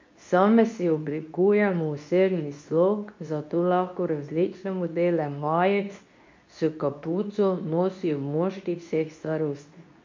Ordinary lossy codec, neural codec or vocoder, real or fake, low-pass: MP3, 48 kbps; codec, 24 kHz, 0.9 kbps, WavTokenizer, medium speech release version 2; fake; 7.2 kHz